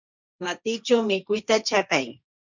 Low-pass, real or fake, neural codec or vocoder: 7.2 kHz; fake; codec, 16 kHz, 1.1 kbps, Voila-Tokenizer